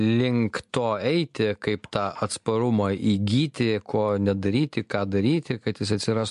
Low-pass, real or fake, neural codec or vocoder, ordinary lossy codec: 10.8 kHz; real; none; MP3, 64 kbps